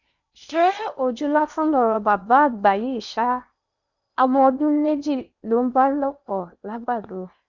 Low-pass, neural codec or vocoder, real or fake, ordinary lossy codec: 7.2 kHz; codec, 16 kHz in and 24 kHz out, 0.8 kbps, FocalCodec, streaming, 65536 codes; fake; none